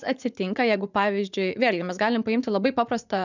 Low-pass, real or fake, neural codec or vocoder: 7.2 kHz; fake; vocoder, 44.1 kHz, 80 mel bands, Vocos